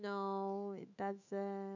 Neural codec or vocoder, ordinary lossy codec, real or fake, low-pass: autoencoder, 48 kHz, 128 numbers a frame, DAC-VAE, trained on Japanese speech; none; fake; 7.2 kHz